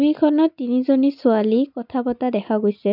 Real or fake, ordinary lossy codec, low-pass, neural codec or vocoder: real; none; 5.4 kHz; none